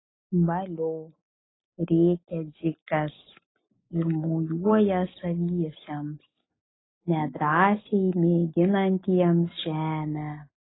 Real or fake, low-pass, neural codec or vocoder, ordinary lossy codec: real; 7.2 kHz; none; AAC, 16 kbps